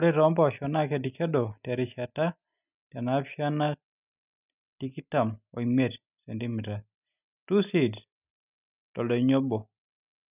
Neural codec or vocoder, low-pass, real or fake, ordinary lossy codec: none; 3.6 kHz; real; none